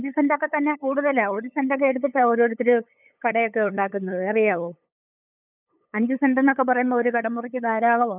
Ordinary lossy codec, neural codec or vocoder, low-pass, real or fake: none; codec, 16 kHz, 8 kbps, FunCodec, trained on LibriTTS, 25 frames a second; 3.6 kHz; fake